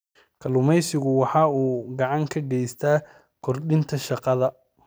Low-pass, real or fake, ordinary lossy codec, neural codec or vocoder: none; real; none; none